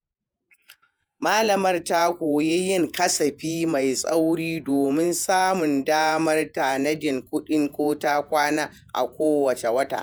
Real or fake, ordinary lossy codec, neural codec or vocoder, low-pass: fake; none; vocoder, 48 kHz, 128 mel bands, Vocos; none